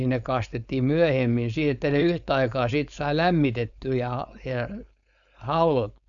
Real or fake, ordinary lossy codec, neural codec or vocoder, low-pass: fake; none; codec, 16 kHz, 4.8 kbps, FACodec; 7.2 kHz